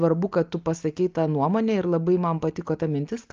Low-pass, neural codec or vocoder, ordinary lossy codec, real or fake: 7.2 kHz; none; Opus, 24 kbps; real